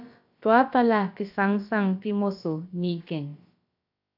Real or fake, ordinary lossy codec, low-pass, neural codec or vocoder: fake; AAC, 48 kbps; 5.4 kHz; codec, 16 kHz, about 1 kbps, DyCAST, with the encoder's durations